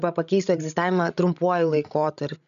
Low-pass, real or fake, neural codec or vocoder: 7.2 kHz; fake; codec, 16 kHz, 16 kbps, FreqCodec, larger model